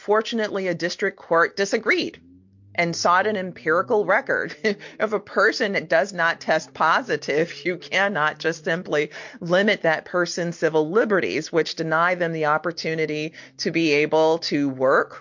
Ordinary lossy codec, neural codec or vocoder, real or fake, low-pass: MP3, 48 kbps; none; real; 7.2 kHz